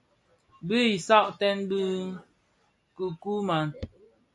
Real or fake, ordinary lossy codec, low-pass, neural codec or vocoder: real; MP3, 96 kbps; 10.8 kHz; none